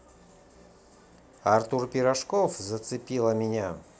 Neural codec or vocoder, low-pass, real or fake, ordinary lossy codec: none; none; real; none